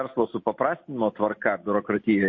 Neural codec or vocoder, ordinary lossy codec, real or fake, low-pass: none; MP3, 32 kbps; real; 7.2 kHz